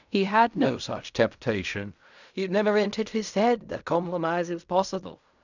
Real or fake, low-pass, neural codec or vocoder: fake; 7.2 kHz; codec, 16 kHz in and 24 kHz out, 0.4 kbps, LongCat-Audio-Codec, fine tuned four codebook decoder